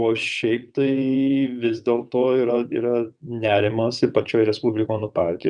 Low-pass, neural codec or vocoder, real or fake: 9.9 kHz; vocoder, 22.05 kHz, 80 mel bands, WaveNeXt; fake